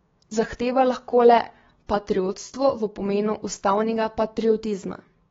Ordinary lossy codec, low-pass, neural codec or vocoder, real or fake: AAC, 24 kbps; 7.2 kHz; codec, 16 kHz, 6 kbps, DAC; fake